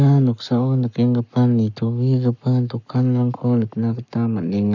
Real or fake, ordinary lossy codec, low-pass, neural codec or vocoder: fake; none; 7.2 kHz; codec, 44.1 kHz, 7.8 kbps, Pupu-Codec